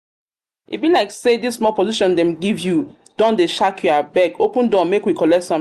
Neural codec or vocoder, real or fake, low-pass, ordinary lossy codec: none; real; 14.4 kHz; Opus, 64 kbps